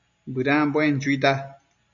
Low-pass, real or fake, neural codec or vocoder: 7.2 kHz; real; none